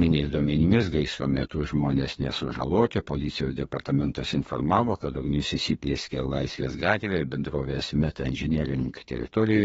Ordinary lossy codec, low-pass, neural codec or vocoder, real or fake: AAC, 24 kbps; 14.4 kHz; codec, 32 kHz, 1.9 kbps, SNAC; fake